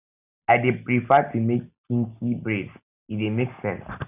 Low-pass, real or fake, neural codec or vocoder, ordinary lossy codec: 3.6 kHz; real; none; none